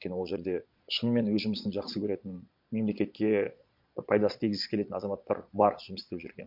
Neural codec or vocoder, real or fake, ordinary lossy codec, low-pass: vocoder, 22.05 kHz, 80 mel bands, Vocos; fake; MP3, 48 kbps; 5.4 kHz